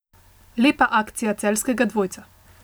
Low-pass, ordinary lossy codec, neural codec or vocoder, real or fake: none; none; none; real